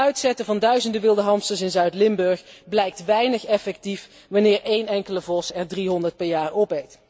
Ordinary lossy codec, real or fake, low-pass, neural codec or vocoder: none; real; none; none